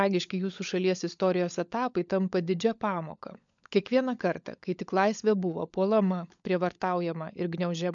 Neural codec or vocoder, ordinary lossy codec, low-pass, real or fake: codec, 16 kHz, 16 kbps, FunCodec, trained on LibriTTS, 50 frames a second; MP3, 64 kbps; 7.2 kHz; fake